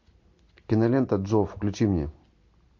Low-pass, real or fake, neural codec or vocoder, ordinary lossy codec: 7.2 kHz; real; none; MP3, 48 kbps